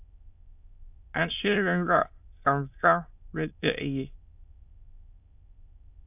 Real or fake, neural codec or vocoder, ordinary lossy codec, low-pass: fake; autoencoder, 22.05 kHz, a latent of 192 numbers a frame, VITS, trained on many speakers; AAC, 32 kbps; 3.6 kHz